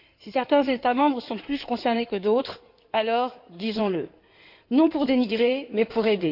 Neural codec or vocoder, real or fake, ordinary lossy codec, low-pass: codec, 16 kHz in and 24 kHz out, 2.2 kbps, FireRedTTS-2 codec; fake; none; 5.4 kHz